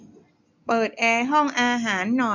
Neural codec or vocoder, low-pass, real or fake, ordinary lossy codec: none; 7.2 kHz; real; none